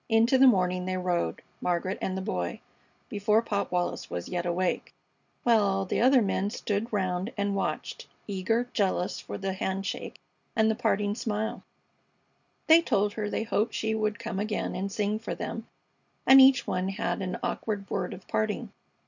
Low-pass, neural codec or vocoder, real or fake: 7.2 kHz; none; real